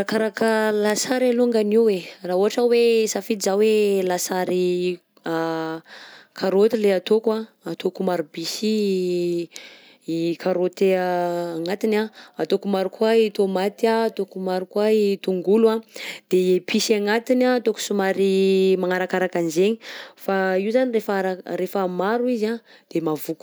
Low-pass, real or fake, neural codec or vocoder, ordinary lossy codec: none; real; none; none